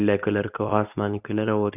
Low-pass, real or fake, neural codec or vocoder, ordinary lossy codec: 3.6 kHz; fake; codec, 24 kHz, 0.9 kbps, WavTokenizer, medium speech release version 2; none